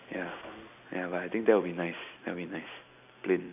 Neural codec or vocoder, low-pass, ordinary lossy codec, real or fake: none; 3.6 kHz; AAC, 32 kbps; real